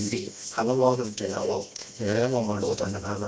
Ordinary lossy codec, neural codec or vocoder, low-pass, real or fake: none; codec, 16 kHz, 1 kbps, FreqCodec, smaller model; none; fake